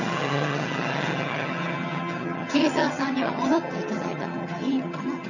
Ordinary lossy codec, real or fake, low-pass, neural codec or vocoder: none; fake; 7.2 kHz; vocoder, 22.05 kHz, 80 mel bands, HiFi-GAN